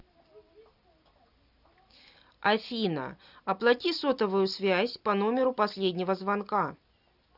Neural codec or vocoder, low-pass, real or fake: none; 5.4 kHz; real